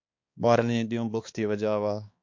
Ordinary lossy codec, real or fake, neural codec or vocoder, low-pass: MP3, 48 kbps; fake; codec, 16 kHz, 2 kbps, X-Codec, HuBERT features, trained on balanced general audio; 7.2 kHz